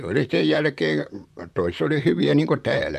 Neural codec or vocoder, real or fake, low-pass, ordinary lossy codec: vocoder, 44.1 kHz, 128 mel bands, Pupu-Vocoder; fake; 14.4 kHz; MP3, 96 kbps